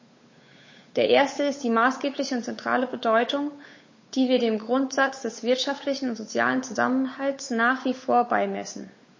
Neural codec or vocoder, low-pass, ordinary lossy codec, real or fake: codec, 16 kHz, 8 kbps, FunCodec, trained on Chinese and English, 25 frames a second; 7.2 kHz; MP3, 32 kbps; fake